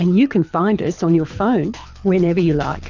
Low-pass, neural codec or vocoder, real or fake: 7.2 kHz; codec, 24 kHz, 6 kbps, HILCodec; fake